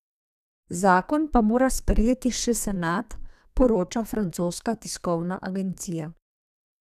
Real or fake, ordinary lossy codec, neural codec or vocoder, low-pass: fake; none; codec, 32 kHz, 1.9 kbps, SNAC; 14.4 kHz